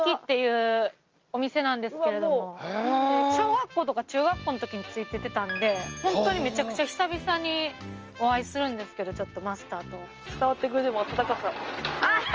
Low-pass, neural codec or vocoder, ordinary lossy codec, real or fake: 7.2 kHz; none; Opus, 24 kbps; real